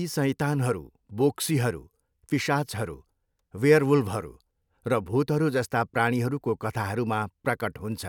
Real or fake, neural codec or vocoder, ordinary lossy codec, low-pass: real; none; none; 19.8 kHz